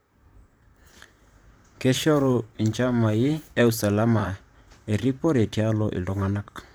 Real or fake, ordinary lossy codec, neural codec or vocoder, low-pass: fake; none; vocoder, 44.1 kHz, 128 mel bands, Pupu-Vocoder; none